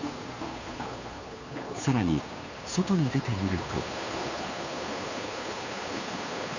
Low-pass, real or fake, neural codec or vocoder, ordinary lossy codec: 7.2 kHz; fake; codec, 16 kHz, 2 kbps, FunCodec, trained on Chinese and English, 25 frames a second; none